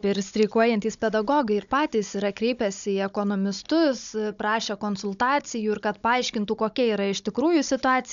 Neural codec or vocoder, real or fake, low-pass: codec, 16 kHz, 16 kbps, FunCodec, trained on Chinese and English, 50 frames a second; fake; 7.2 kHz